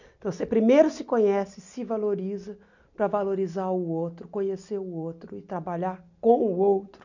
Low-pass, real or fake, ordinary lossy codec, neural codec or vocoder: 7.2 kHz; real; none; none